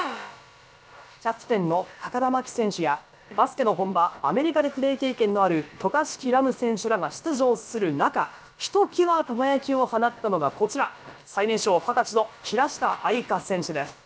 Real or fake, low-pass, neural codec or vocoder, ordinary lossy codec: fake; none; codec, 16 kHz, about 1 kbps, DyCAST, with the encoder's durations; none